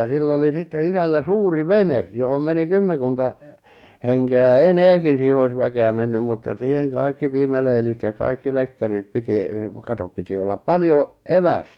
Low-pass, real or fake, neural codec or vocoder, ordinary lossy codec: 19.8 kHz; fake; codec, 44.1 kHz, 2.6 kbps, DAC; none